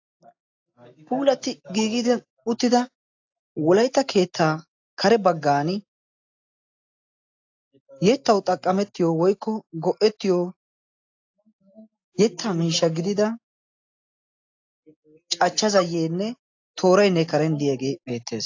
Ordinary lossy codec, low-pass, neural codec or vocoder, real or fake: AAC, 48 kbps; 7.2 kHz; none; real